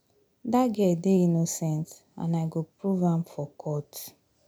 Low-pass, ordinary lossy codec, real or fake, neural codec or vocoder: none; none; real; none